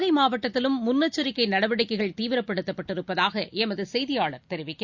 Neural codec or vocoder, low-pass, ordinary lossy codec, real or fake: none; 7.2 kHz; Opus, 64 kbps; real